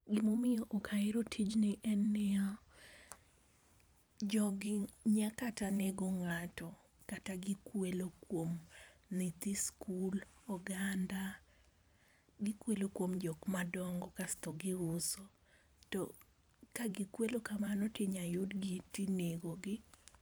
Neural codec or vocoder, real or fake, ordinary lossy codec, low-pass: vocoder, 44.1 kHz, 128 mel bands every 512 samples, BigVGAN v2; fake; none; none